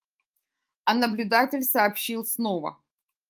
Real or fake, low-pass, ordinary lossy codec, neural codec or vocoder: fake; 14.4 kHz; Opus, 32 kbps; autoencoder, 48 kHz, 128 numbers a frame, DAC-VAE, trained on Japanese speech